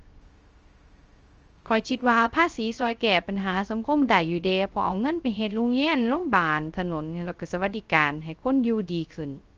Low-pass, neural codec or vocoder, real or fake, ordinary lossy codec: 7.2 kHz; codec, 16 kHz, 0.3 kbps, FocalCodec; fake; Opus, 24 kbps